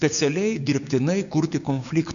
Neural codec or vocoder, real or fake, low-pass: codec, 16 kHz, 6 kbps, DAC; fake; 7.2 kHz